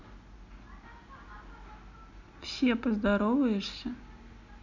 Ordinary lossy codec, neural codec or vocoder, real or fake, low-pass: none; none; real; 7.2 kHz